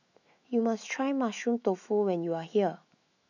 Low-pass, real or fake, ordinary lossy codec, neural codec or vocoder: 7.2 kHz; fake; none; autoencoder, 48 kHz, 128 numbers a frame, DAC-VAE, trained on Japanese speech